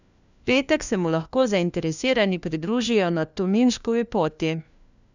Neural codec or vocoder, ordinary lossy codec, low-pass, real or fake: codec, 16 kHz, 1 kbps, FunCodec, trained on LibriTTS, 50 frames a second; none; 7.2 kHz; fake